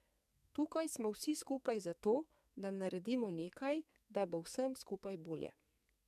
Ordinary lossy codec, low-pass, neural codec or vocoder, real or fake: MP3, 96 kbps; 14.4 kHz; codec, 44.1 kHz, 2.6 kbps, SNAC; fake